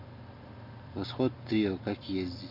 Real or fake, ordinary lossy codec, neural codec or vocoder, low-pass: real; none; none; 5.4 kHz